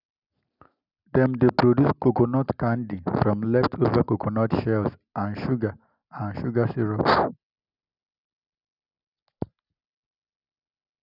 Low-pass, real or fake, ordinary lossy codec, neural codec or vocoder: 5.4 kHz; real; none; none